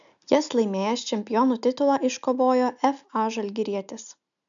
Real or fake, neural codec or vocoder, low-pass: real; none; 7.2 kHz